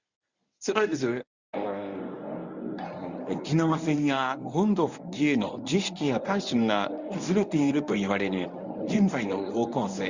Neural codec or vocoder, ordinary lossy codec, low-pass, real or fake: codec, 24 kHz, 0.9 kbps, WavTokenizer, medium speech release version 1; Opus, 64 kbps; 7.2 kHz; fake